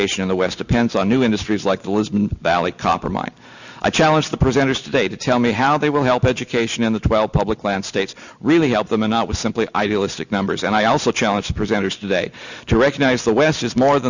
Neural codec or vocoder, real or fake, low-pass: none; real; 7.2 kHz